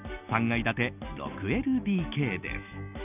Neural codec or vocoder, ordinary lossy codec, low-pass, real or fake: none; none; 3.6 kHz; real